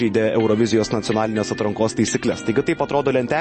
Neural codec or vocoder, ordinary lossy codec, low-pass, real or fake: none; MP3, 32 kbps; 10.8 kHz; real